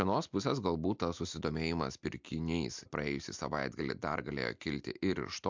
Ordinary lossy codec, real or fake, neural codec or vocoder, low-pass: MP3, 64 kbps; real; none; 7.2 kHz